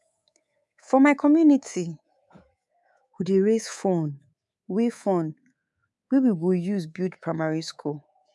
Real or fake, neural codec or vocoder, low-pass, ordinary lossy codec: fake; codec, 24 kHz, 3.1 kbps, DualCodec; none; none